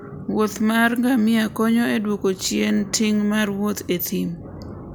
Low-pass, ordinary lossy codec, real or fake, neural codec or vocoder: none; none; real; none